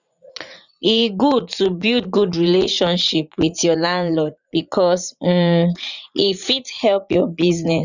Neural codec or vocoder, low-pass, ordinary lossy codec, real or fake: vocoder, 44.1 kHz, 80 mel bands, Vocos; 7.2 kHz; none; fake